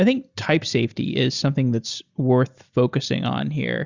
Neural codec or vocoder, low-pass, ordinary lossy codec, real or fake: none; 7.2 kHz; Opus, 64 kbps; real